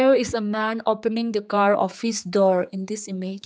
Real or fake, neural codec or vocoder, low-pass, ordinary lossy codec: fake; codec, 16 kHz, 4 kbps, X-Codec, HuBERT features, trained on general audio; none; none